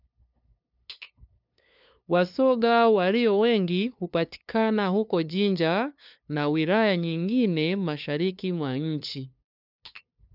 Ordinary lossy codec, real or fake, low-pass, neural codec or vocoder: none; fake; 5.4 kHz; codec, 16 kHz, 2 kbps, FunCodec, trained on LibriTTS, 25 frames a second